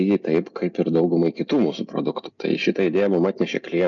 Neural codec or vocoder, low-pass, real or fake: none; 7.2 kHz; real